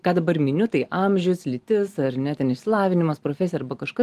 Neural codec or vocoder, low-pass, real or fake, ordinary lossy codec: none; 14.4 kHz; real; Opus, 24 kbps